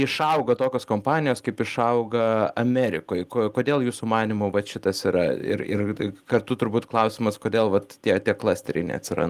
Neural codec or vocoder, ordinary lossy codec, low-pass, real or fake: none; Opus, 24 kbps; 14.4 kHz; real